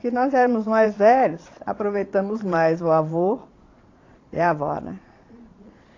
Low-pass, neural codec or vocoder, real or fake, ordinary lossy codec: 7.2 kHz; vocoder, 22.05 kHz, 80 mel bands, Vocos; fake; AAC, 32 kbps